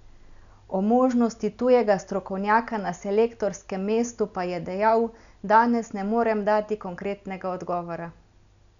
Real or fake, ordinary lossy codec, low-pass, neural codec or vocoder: real; none; 7.2 kHz; none